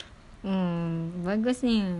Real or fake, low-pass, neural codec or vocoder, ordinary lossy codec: real; 10.8 kHz; none; none